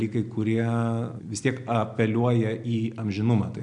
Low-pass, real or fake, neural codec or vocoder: 9.9 kHz; real; none